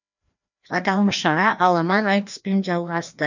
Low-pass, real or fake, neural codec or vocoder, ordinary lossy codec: 7.2 kHz; fake; codec, 16 kHz, 1 kbps, FreqCodec, larger model; none